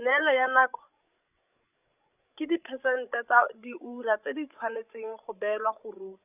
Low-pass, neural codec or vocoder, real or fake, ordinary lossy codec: 3.6 kHz; codec, 16 kHz, 16 kbps, FreqCodec, larger model; fake; none